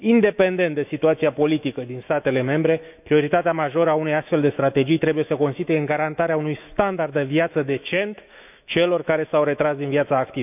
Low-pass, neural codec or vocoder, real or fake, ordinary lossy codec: 3.6 kHz; autoencoder, 48 kHz, 128 numbers a frame, DAC-VAE, trained on Japanese speech; fake; none